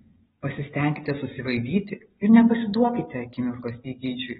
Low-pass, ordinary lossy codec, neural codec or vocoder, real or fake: 7.2 kHz; AAC, 16 kbps; none; real